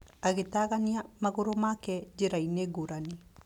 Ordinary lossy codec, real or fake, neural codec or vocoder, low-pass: none; real; none; 19.8 kHz